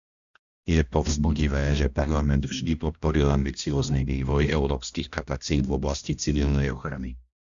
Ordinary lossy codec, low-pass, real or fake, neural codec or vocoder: Opus, 64 kbps; 7.2 kHz; fake; codec, 16 kHz, 1 kbps, X-Codec, HuBERT features, trained on balanced general audio